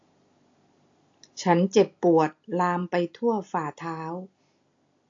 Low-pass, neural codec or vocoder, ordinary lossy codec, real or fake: 7.2 kHz; none; AAC, 48 kbps; real